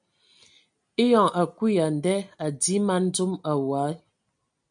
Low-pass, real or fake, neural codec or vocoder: 9.9 kHz; real; none